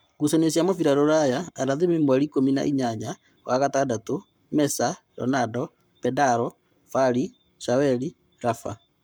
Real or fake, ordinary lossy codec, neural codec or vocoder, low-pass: fake; none; codec, 44.1 kHz, 7.8 kbps, Pupu-Codec; none